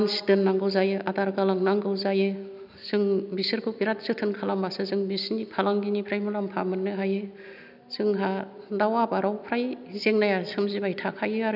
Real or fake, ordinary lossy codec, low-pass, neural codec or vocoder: real; none; 5.4 kHz; none